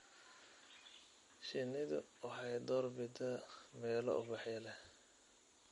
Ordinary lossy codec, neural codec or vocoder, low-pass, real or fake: MP3, 48 kbps; none; 19.8 kHz; real